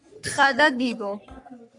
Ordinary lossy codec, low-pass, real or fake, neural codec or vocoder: MP3, 96 kbps; 10.8 kHz; fake; codec, 44.1 kHz, 3.4 kbps, Pupu-Codec